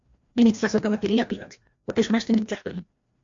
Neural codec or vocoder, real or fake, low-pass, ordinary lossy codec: codec, 16 kHz, 1 kbps, FreqCodec, larger model; fake; 7.2 kHz; MP3, 48 kbps